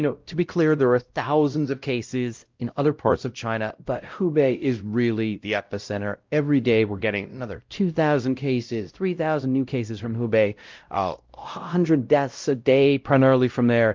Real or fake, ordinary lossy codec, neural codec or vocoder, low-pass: fake; Opus, 24 kbps; codec, 16 kHz, 0.5 kbps, X-Codec, WavLM features, trained on Multilingual LibriSpeech; 7.2 kHz